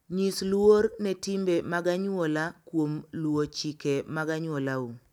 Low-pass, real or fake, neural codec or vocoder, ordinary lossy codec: 19.8 kHz; real; none; none